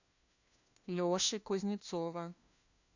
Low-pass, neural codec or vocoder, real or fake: 7.2 kHz; codec, 16 kHz, 1 kbps, FunCodec, trained on LibriTTS, 50 frames a second; fake